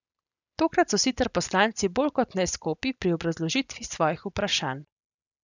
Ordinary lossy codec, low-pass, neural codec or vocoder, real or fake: none; 7.2 kHz; none; real